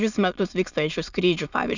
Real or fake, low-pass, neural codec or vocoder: fake; 7.2 kHz; autoencoder, 22.05 kHz, a latent of 192 numbers a frame, VITS, trained on many speakers